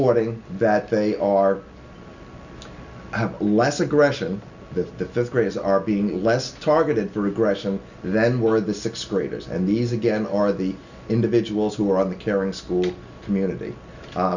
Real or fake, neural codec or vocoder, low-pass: real; none; 7.2 kHz